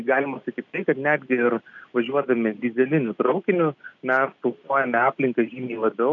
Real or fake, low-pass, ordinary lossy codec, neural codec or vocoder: real; 7.2 kHz; MP3, 64 kbps; none